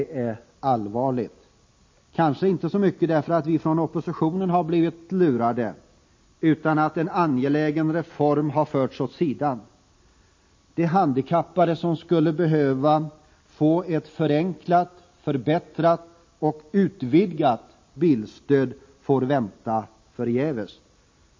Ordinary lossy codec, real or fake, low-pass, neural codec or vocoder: MP3, 32 kbps; real; 7.2 kHz; none